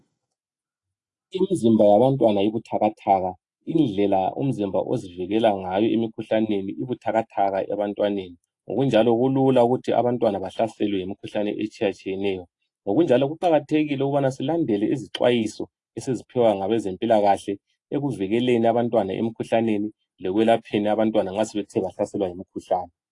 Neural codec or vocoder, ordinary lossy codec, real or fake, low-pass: none; AAC, 48 kbps; real; 10.8 kHz